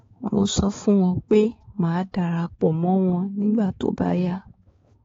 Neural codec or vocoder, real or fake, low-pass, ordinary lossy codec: codec, 16 kHz, 4 kbps, X-Codec, HuBERT features, trained on balanced general audio; fake; 7.2 kHz; AAC, 24 kbps